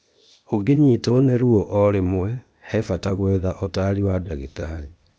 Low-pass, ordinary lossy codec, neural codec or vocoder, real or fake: none; none; codec, 16 kHz, 0.8 kbps, ZipCodec; fake